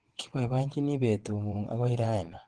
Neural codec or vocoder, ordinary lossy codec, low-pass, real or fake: vocoder, 22.05 kHz, 80 mel bands, WaveNeXt; Opus, 16 kbps; 9.9 kHz; fake